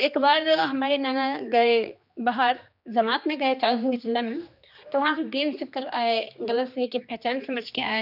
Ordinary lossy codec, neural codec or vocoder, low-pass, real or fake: none; codec, 16 kHz, 2 kbps, X-Codec, HuBERT features, trained on general audio; 5.4 kHz; fake